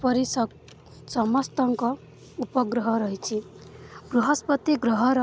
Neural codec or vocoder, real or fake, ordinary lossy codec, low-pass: none; real; none; none